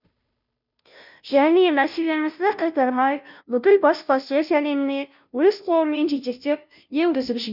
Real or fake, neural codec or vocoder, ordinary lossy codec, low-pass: fake; codec, 16 kHz, 0.5 kbps, FunCodec, trained on Chinese and English, 25 frames a second; none; 5.4 kHz